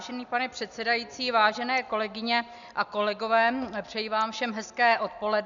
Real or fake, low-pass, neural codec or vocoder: real; 7.2 kHz; none